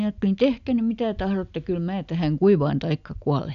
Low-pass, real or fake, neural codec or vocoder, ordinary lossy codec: 7.2 kHz; real; none; AAC, 96 kbps